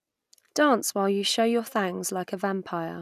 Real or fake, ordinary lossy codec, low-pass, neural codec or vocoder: real; none; 14.4 kHz; none